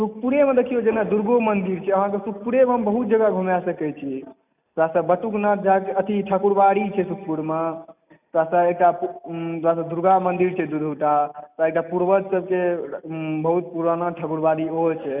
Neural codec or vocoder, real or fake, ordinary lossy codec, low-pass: none; real; none; 3.6 kHz